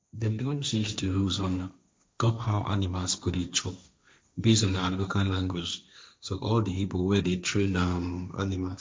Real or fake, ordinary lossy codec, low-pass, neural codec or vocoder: fake; none; none; codec, 16 kHz, 1.1 kbps, Voila-Tokenizer